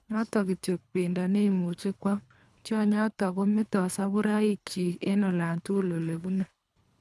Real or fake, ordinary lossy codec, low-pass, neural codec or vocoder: fake; none; none; codec, 24 kHz, 3 kbps, HILCodec